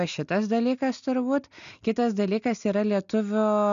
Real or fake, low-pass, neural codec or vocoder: real; 7.2 kHz; none